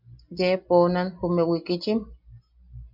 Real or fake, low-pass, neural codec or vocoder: real; 5.4 kHz; none